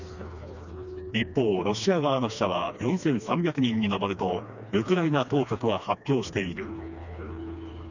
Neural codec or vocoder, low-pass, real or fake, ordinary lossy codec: codec, 16 kHz, 2 kbps, FreqCodec, smaller model; 7.2 kHz; fake; none